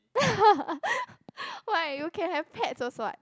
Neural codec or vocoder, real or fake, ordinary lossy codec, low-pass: none; real; none; none